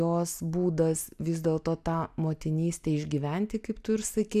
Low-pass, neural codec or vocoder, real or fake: 14.4 kHz; none; real